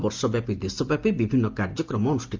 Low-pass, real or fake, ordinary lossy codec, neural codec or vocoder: 7.2 kHz; real; Opus, 32 kbps; none